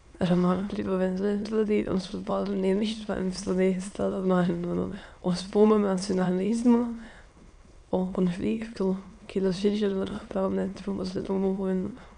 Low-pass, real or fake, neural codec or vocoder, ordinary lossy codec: 9.9 kHz; fake; autoencoder, 22.05 kHz, a latent of 192 numbers a frame, VITS, trained on many speakers; none